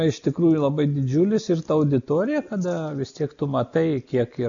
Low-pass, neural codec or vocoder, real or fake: 7.2 kHz; none; real